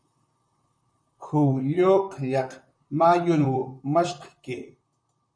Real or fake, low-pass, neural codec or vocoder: fake; 9.9 kHz; vocoder, 44.1 kHz, 128 mel bands, Pupu-Vocoder